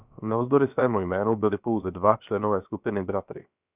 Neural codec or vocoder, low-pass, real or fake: codec, 16 kHz, about 1 kbps, DyCAST, with the encoder's durations; 3.6 kHz; fake